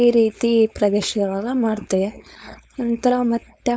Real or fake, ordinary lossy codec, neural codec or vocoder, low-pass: fake; none; codec, 16 kHz, 4.8 kbps, FACodec; none